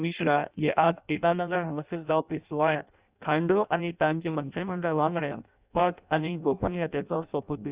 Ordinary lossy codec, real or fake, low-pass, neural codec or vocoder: Opus, 64 kbps; fake; 3.6 kHz; codec, 16 kHz in and 24 kHz out, 0.6 kbps, FireRedTTS-2 codec